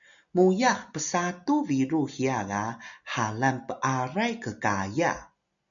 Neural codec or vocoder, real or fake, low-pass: none; real; 7.2 kHz